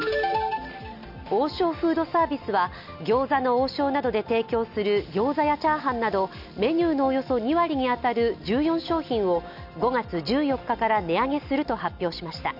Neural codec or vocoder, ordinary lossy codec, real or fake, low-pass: none; none; real; 5.4 kHz